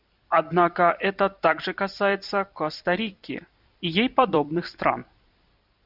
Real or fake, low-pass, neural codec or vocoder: fake; 5.4 kHz; vocoder, 44.1 kHz, 128 mel bands every 256 samples, BigVGAN v2